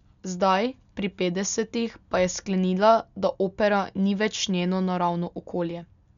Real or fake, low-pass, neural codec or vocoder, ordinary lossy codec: real; 7.2 kHz; none; none